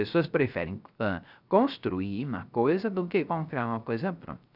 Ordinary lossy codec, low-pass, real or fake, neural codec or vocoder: none; 5.4 kHz; fake; codec, 16 kHz, 0.3 kbps, FocalCodec